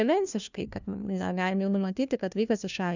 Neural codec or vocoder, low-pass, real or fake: codec, 16 kHz, 1 kbps, FunCodec, trained on LibriTTS, 50 frames a second; 7.2 kHz; fake